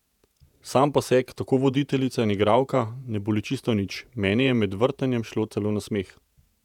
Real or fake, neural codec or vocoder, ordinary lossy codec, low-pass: fake; vocoder, 48 kHz, 128 mel bands, Vocos; none; 19.8 kHz